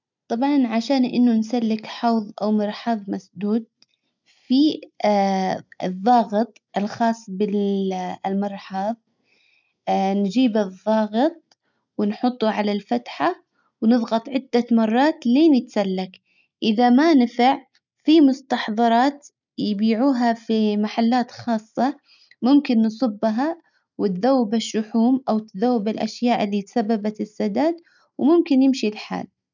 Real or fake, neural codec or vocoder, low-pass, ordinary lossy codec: real; none; 7.2 kHz; none